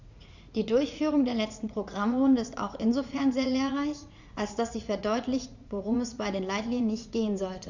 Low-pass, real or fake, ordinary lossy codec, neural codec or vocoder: 7.2 kHz; fake; Opus, 64 kbps; vocoder, 44.1 kHz, 80 mel bands, Vocos